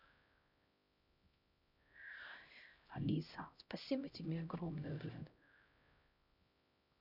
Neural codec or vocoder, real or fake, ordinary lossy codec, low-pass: codec, 16 kHz, 0.5 kbps, X-Codec, HuBERT features, trained on LibriSpeech; fake; none; 5.4 kHz